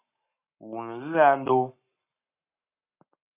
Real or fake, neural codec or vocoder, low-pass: fake; codec, 44.1 kHz, 7.8 kbps, Pupu-Codec; 3.6 kHz